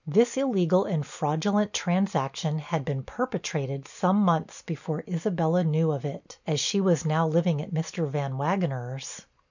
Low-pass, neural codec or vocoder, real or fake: 7.2 kHz; none; real